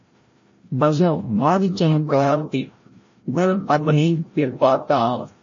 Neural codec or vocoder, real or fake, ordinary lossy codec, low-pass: codec, 16 kHz, 0.5 kbps, FreqCodec, larger model; fake; MP3, 32 kbps; 7.2 kHz